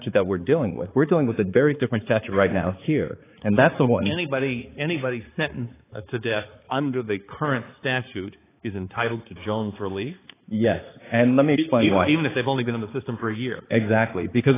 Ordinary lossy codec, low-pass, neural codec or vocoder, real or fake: AAC, 16 kbps; 3.6 kHz; codec, 16 kHz, 4 kbps, X-Codec, HuBERT features, trained on balanced general audio; fake